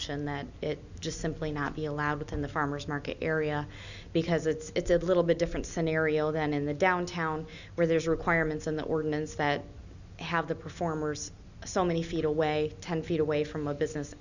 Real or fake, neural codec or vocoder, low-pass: real; none; 7.2 kHz